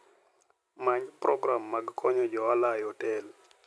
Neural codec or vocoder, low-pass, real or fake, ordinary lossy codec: none; none; real; none